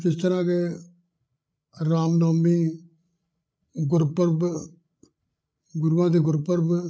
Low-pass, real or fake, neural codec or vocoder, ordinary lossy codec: none; fake; codec, 16 kHz, 8 kbps, FreqCodec, larger model; none